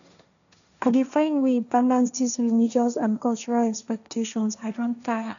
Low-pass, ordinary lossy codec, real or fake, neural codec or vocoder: 7.2 kHz; none; fake; codec, 16 kHz, 1.1 kbps, Voila-Tokenizer